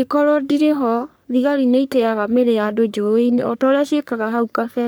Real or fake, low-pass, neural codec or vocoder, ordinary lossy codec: fake; none; codec, 44.1 kHz, 3.4 kbps, Pupu-Codec; none